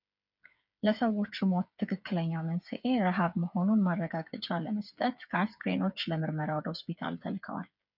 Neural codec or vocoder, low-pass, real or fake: codec, 16 kHz, 8 kbps, FreqCodec, smaller model; 5.4 kHz; fake